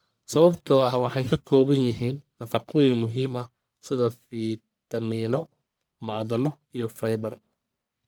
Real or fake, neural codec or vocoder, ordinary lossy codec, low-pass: fake; codec, 44.1 kHz, 1.7 kbps, Pupu-Codec; none; none